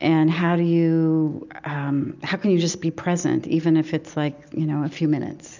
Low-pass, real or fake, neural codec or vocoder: 7.2 kHz; real; none